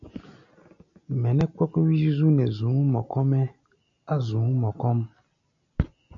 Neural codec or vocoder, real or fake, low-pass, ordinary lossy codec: none; real; 7.2 kHz; AAC, 64 kbps